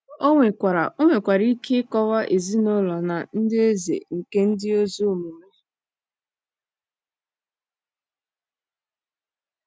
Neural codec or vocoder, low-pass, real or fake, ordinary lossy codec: none; none; real; none